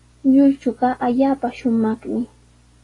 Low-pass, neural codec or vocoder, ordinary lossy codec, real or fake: 10.8 kHz; none; AAC, 32 kbps; real